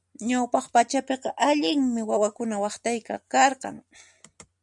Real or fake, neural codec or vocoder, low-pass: real; none; 10.8 kHz